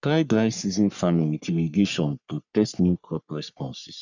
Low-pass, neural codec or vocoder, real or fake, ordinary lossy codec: 7.2 kHz; codec, 44.1 kHz, 3.4 kbps, Pupu-Codec; fake; none